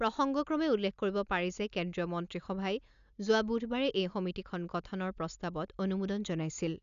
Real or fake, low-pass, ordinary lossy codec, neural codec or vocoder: real; 7.2 kHz; none; none